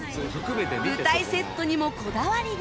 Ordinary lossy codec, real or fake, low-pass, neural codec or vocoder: none; real; none; none